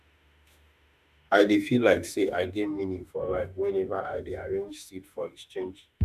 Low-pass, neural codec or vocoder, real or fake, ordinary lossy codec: 14.4 kHz; autoencoder, 48 kHz, 32 numbers a frame, DAC-VAE, trained on Japanese speech; fake; none